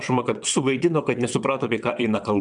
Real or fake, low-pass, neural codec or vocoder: fake; 9.9 kHz; vocoder, 22.05 kHz, 80 mel bands, WaveNeXt